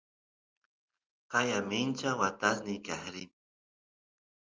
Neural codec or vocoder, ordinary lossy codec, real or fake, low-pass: none; Opus, 32 kbps; real; 7.2 kHz